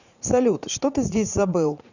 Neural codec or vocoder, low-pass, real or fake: vocoder, 44.1 kHz, 80 mel bands, Vocos; 7.2 kHz; fake